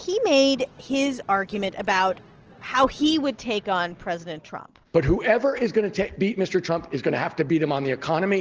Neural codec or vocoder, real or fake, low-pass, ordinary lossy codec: none; real; 7.2 kHz; Opus, 16 kbps